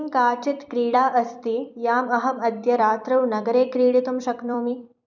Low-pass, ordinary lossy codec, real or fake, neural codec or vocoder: 7.2 kHz; none; fake; vocoder, 44.1 kHz, 128 mel bands every 256 samples, BigVGAN v2